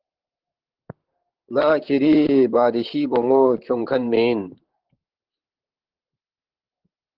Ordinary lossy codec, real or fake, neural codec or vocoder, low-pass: Opus, 16 kbps; fake; vocoder, 44.1 kHz, 128 mel bands, Pupu-Vocoder; 5.4 kHz